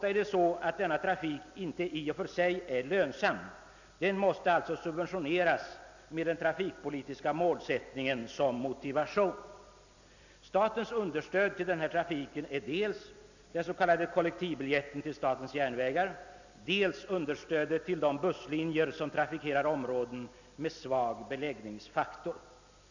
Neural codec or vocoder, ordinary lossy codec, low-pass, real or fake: none; none; 7.2 kHz; real